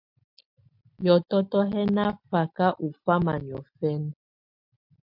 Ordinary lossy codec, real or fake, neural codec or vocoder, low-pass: MP3, 48 kbps; real; none; 5.4 kHz